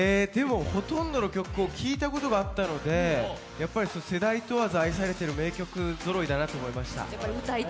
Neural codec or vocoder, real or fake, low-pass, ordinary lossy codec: none; real; none; none